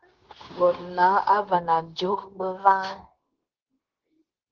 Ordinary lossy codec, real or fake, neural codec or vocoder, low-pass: Opus, 16 kbps; fake; codec, 16 kHz, 0.9 kbps, LongCat-Audio-Codec; 7.2 kHz